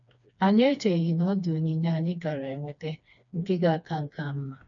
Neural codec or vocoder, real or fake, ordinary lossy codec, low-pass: codec, 16 kHz, 2 kbps, FreqCodec, smaller model; fake; none; 7.2 kHz